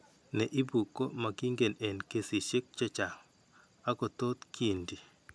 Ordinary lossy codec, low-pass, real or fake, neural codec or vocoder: none; none; real; none